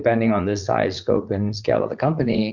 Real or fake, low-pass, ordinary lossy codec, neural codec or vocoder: fake; 7.2 kHz; MP3, 64 kbps; vocoder, 44.1 kHz, 80 mel bands, Vocos